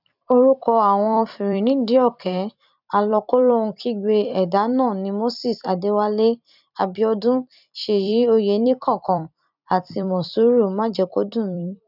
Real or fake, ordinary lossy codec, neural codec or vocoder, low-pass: fake; none; vocoder, 44.1 kHz, 128 mel bands every 256 samples, BigVGAN v2; 5.4 kHz